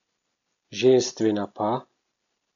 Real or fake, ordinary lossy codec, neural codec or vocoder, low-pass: real; none; none; 7.2 kHz